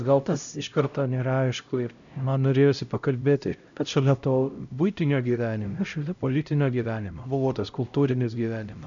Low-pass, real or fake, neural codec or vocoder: 7.2 kHz; fake; codec, 16 kHz, 0.5 kbps, X-Codec, HuBERT features, trained on LibriSpeech